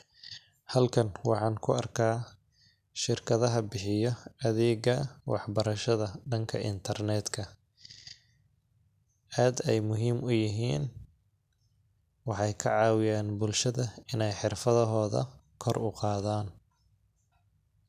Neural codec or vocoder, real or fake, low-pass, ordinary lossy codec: none; real; 14.4 kHz; none